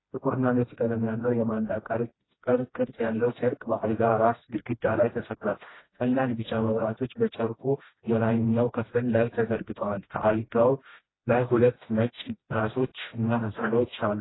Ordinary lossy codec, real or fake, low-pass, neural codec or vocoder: AAC, 16 kbps; fake; 7.2 kHz; codec, 16 kHz, 1 kbps, FreqCodec, smaller model